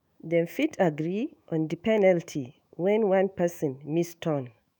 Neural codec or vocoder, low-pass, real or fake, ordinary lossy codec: autoencoder, 48 kHz, 128 numbers a frame, DAC-VAE, trained on Japanese speech; none; fake; none